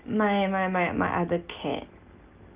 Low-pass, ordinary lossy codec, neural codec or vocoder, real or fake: 3.6 kHz; Opus, 24 kbps; none; real